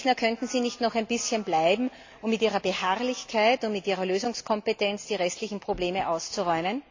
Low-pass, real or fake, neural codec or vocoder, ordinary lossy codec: 7.2 kHz; real; none; AAC, 48 kbps